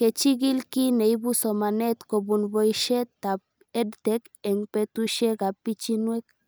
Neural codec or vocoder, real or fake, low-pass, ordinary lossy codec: none; real; none; none